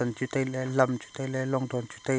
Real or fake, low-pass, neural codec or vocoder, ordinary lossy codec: real; none; none; none